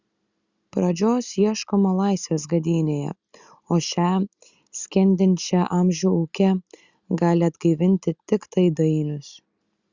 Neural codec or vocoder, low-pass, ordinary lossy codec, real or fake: none; 7.2 kHz; Opus, 64 kbps; real